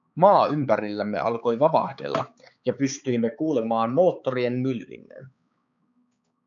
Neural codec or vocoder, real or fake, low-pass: codec, 16 kHz, 4 kbps, X-Codec, HuBERT features, trained on balanced general audio; fake; 7.2 kHz